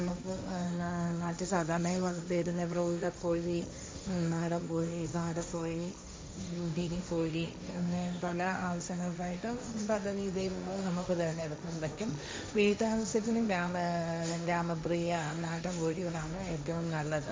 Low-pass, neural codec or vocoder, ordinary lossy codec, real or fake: none; codec, 16 kHz, 1.1 kbps, Voila-Tokenizer; none; fake